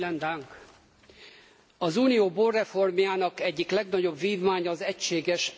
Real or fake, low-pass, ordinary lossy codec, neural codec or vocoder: real; none; none; none